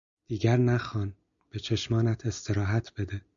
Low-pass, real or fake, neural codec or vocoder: 7.2 kHz; real; none